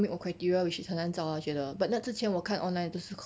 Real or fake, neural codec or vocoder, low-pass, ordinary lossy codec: real; none; none; none